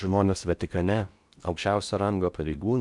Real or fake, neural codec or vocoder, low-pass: fake; codec, 16 kHz in and 24 kHz out, 0.6 kbps, FocalCodec, streaming, 4096 codes; 10.8 kHz